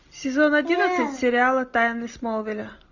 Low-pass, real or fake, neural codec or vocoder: 7.2 kHz; real; none